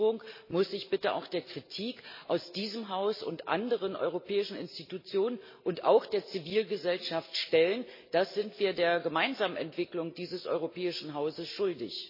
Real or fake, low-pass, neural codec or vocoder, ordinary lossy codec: real; 5.4 kHz; none; MP3, 24 kbps